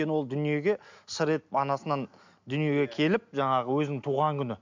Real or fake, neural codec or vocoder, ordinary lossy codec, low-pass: real; none; MP3, 64 kbps; 7.2 kHz